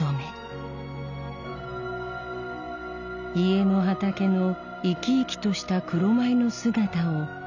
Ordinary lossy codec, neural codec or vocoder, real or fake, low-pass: none; none; real; 7.2 kHz